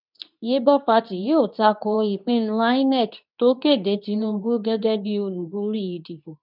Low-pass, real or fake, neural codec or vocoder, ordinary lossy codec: 5.4 kHz; fake; codec, 24 kHz, 0.9 kbps, WavTokenizer, medium speech release version 2; none